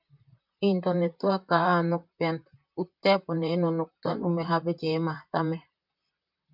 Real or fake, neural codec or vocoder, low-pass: fake; vocoder, 44.1 kHz, 128 mel bands, Pupu-Vocoder; 5.4 kHz